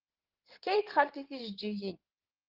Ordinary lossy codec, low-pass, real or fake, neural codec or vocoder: Opus, 32 kbps; 5.4 kHz; fake; codec, 16 kHz in and 24 kHz out, 2.2 kbps, FireRedTTS-2 codec